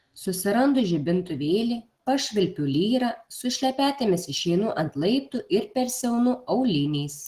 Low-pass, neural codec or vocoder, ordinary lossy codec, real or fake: 14.4 kHz; none; Opus, 16 kbps; real